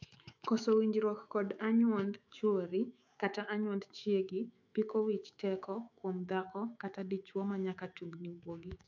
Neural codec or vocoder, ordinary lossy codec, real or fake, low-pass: autoencoder, 48 kHz, 128 numbers a frame, DAC-VAE, trained on Japanese speech; AAC, 48 kbps; fake; 7.2 kHz